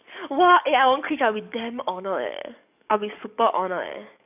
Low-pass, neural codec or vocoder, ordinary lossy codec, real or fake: 3.6 kHz; codec, 44.1 kHz, 7.8 kbps, DAC; none; fake